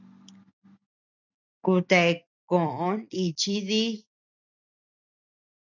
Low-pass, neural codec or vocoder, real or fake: 7.2 kHz; none; real